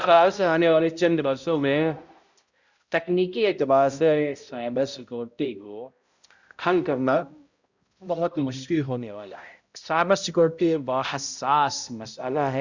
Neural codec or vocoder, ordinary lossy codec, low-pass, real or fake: codec, 16 kHz, 0.5 kbps, X-Codec, HuBERT features, trained on balanced general audio; Opus, 64 kbps; 7.2 kHz; fake